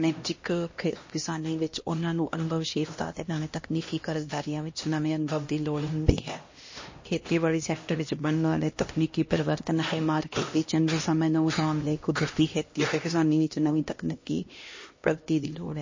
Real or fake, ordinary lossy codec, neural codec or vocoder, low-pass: fake; MP3, 32 kbps; codec, 16 kHz, 1 kbps, X-Codec, HuBERT features, trained on LibriSpeech; 7.2 kHz